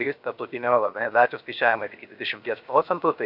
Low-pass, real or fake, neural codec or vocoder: 5.4 kHz; fake; codec, 16 kHz, 0.7 kbps, FocalCodec